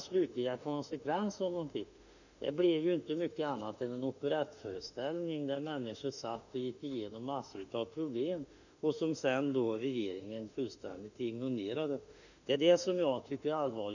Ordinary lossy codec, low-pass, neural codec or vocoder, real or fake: none; 7.2 kHz; autoencoder, 48 kHz, 32 numbers a frame, DAC-VAE, trained on Japanese speech; fake